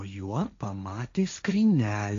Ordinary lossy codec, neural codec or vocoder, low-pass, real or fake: MP3, 64 kbps; codec, 16 kHz, 1.1 kbps, Voila-Tokenizer; 7.2 kHz; fake